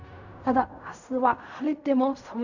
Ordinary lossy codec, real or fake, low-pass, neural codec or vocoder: none; fake; 7.2 kHz; codec, 16 kHz in and 24 kHz out, 0.4 kbps, LongCat-Audio-Codec, fine tuned four codebook decoder